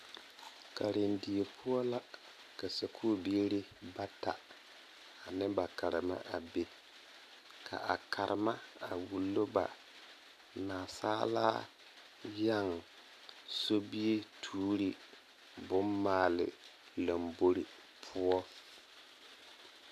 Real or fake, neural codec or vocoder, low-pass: real; none; 14.4 kHz